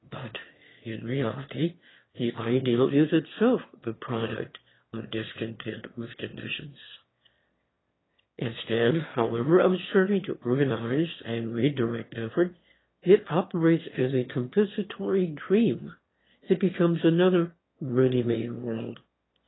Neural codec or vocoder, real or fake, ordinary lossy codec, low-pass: autoencoder, 22.05 kHz, a latent of 192 numbers a frame, VITS, trained on one speaker; fake; AAC, 16 kbps; 7.2 kHz